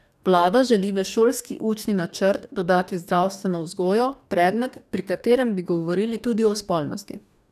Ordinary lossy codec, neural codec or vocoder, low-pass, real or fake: none; codec, 44.1 kHz, 2.6 kbps, DAC; 14.4 kHz; fake